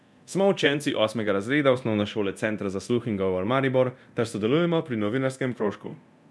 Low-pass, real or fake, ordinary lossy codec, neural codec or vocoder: none; fake; none; codec, 24 kHz, 0.9 kbps, DualCodec